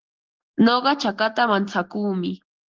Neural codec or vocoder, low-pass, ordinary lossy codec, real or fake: none; 7.2 kHz; Opus, 16 kbps; real